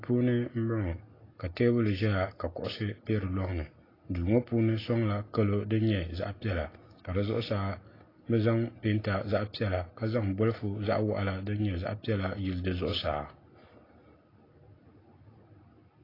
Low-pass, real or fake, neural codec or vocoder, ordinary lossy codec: 5.4 kHz; real; none; AAC, 24 kbps